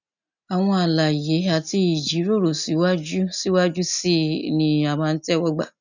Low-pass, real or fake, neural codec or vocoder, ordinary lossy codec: 7.2 kHz; real; none; none